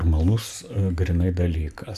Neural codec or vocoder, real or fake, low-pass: none; real; 14.4 kHz